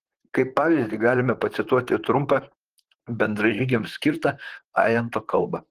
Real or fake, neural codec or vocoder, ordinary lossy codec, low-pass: fake; vocoder, 44.1 kHz, 128 mel bands, Pupu-Vocoder; Opus, 16 kbps; 14.4 kHz